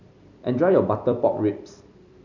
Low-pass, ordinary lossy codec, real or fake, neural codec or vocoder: 7.2 kHz; none; fake; vocoder, 44.1 kHz, 128 mel bands every 256 samples, BigVGAN v2